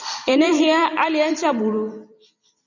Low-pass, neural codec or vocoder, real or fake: 7.2 kHz; vocoder, 44.1 kHz, 128 mel bands every 256 samples, BigVGAN v2; fake